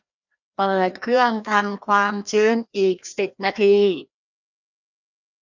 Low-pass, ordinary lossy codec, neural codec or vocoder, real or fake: 7.2 kHz; none; codec, 16 kHz, 1 kbps, FreqCodec, larger model; fake